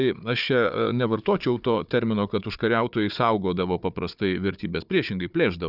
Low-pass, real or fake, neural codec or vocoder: 5.4 kHz; fake; codec, 16 kHz, 4 kbps, FunCodec, trained on Chinese and English, 50 frames a second